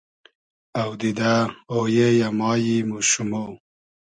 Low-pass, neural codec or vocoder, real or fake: 9.9 kHz; none; real